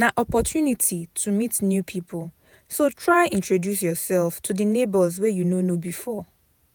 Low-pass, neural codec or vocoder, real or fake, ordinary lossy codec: none; vocoder, 48 kHz, 128 mel bands, Vocos; fake; none